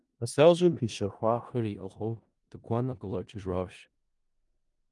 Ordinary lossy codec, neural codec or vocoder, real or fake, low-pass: Opus, 32 kbps; codec, 16 kHz in and 24 kHz out, 0.4 kbps, LongCat-Audio-Codec, four codebook decoder; fake; 10.8 kHz